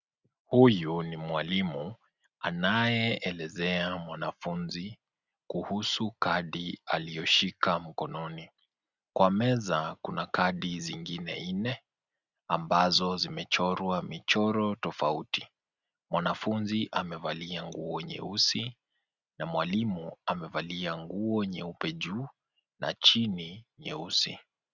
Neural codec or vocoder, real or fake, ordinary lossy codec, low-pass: none; real; Opus, 64 kbps; 7.2 kHz